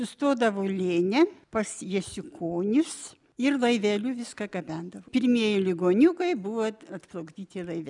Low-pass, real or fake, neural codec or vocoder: 10.8 kHz; real; none